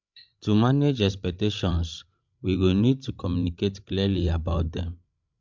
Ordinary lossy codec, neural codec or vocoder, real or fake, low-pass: MP3, 64 kbps; codec, 16 kHz, 16 kbps, FreqCodec, larger model; fake; 7.2 kHz